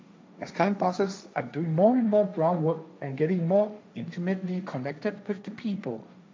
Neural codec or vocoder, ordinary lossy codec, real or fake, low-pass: codec, 16 kHz, 1.1 kbps, Voila-Tokenizer; none; fake; none